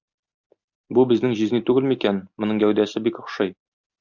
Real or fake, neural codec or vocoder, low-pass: real; none; 7.2 kHz